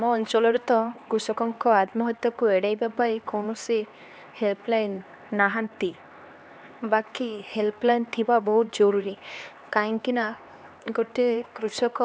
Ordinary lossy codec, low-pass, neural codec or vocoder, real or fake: none; none; codec, 16 kHz, 2 kbps, X-Codec, HuBERT features, trained on LibriSpeech; fake